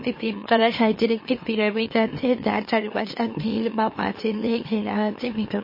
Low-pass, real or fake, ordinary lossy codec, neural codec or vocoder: 5.4 kHz; fake; MP3, 24 kbps; autoencoder, 44.1 kHz, a latent of 192 numbers a frame, MeloTTS